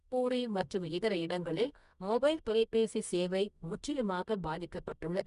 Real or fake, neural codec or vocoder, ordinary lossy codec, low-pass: fake; codec, 24 kHz, 0.9 kbps, WavTokenizer, medium music audio release; none; 10.8 kHz